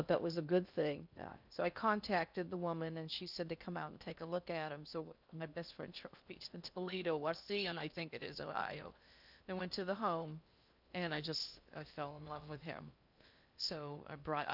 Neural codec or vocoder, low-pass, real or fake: codec, 16 kHz in and 24 kHz out, 0.6 kbps, FocalCodec, streaming, 2048 codes; 5.4 kHz; fake